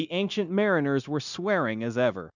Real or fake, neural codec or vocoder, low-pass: fake; codec, 16 kHz, 0.9 kbps, LongCat-Audio-Codec; 7.2 kHz